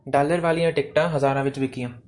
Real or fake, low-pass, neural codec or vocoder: real; 10.8 kHz; none